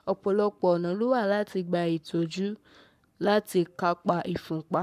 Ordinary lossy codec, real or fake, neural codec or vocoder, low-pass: none; fake; codec, 44.1 kHz, 7.8 kbps, Pupu-Codec; 14.4 kHz